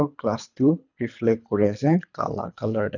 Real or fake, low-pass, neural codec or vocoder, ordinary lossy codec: fake; 7.2 kHz; codec, 24 kHz, 3 kbps, HILCodec; none